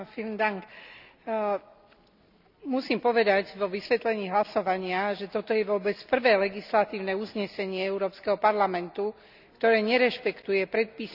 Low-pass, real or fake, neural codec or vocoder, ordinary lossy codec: 5.4 kHz; real; none; none